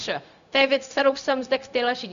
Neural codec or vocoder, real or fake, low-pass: codec, 16 kHz, 0.4 kbps, LongCat-Audio-Codec; fake; 7.2 kHz